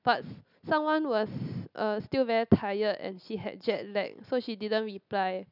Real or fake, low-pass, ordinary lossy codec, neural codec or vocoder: real; 5.4 kHz; none; none